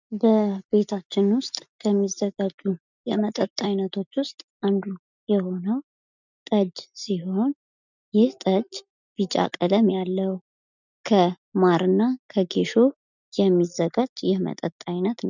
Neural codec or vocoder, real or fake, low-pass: none; real; 7.2 kHz